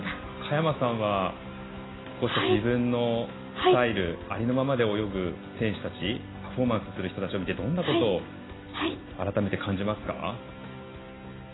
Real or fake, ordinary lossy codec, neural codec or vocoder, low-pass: real; AAC, 16 kbps; none; 7.2 kHz